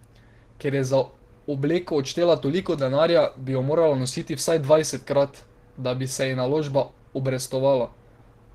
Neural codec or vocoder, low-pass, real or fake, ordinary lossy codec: none; 14.4 kHz; real; Opus, 16 kbps